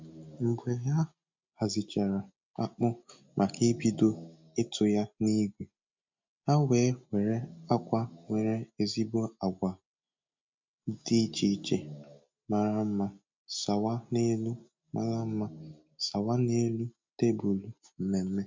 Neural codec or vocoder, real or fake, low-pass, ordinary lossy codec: none; real; 7.2 kHz; MP3, 64 kbps